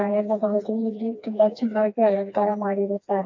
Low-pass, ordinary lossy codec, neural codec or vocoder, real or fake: 7.2 kHz; AAC, 48 kbps; codec, 16 kHz, 2 kbps, FreqCodec, smaller model; fake